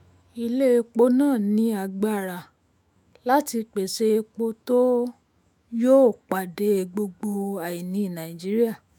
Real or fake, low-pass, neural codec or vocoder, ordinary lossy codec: fake; 19.8 kHz; autoencoder, 48 kHz, 128 numbers a frame, DAC-VAE, trained on Japanese speech; none